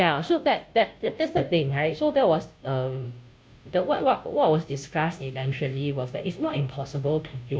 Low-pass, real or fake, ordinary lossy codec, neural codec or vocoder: none; fake; none; codec, 16 kHz, 0.5 kbps, FunCodec, trained on Chinese and English, 25 frames a second